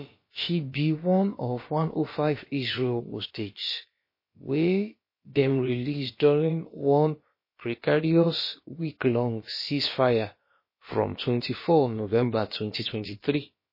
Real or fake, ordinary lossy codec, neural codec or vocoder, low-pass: fake; MP3, 24 kbps; codec, 16 kHz, about 1 kbps, DyCAST, with the encoder's durations; 5.4 kHz